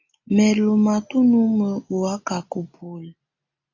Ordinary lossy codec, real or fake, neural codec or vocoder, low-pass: AAC, 48 kbps; real; none; 7.2 kHz